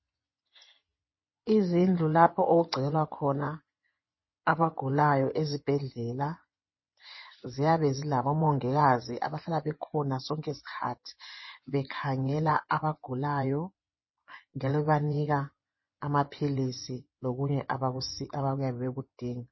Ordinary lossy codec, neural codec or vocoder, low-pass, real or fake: MP3, 24 kbps; vocoder, 24 kHz, 100 mel bands, Vocos; 7.2 kHz; fake